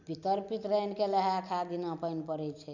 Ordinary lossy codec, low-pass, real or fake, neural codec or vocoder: none; 7.2 kHz; real; none